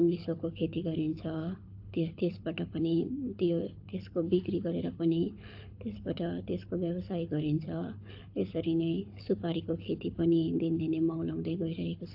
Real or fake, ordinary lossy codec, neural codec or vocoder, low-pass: fake; AAC, 48 kbps; codec, 24 kHz, 6 kbps, HILCodec; 5.4 kHz